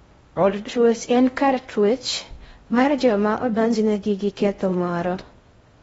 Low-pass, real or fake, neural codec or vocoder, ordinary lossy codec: 10.8 kHz; fake; codec, 16 kHz in and 24 kHz out, 0.6 kbps, FocalCodec, streaming, 4096 codes; AAC, 24 kbps